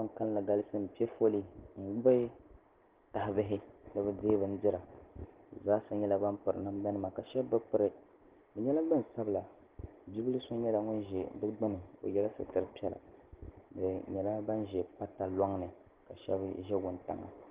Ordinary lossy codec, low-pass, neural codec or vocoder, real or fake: Opus, 16 kbps; 3.6 kHz; none; real